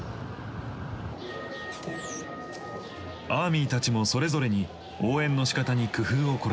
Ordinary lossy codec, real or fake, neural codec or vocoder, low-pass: none; real; none; none